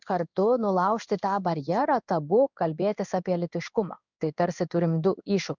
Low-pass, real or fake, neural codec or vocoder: 7.2 kHz; fake; codec, 16 kHz in and 24 kHz out, 1 kbps, XY-Tokenizer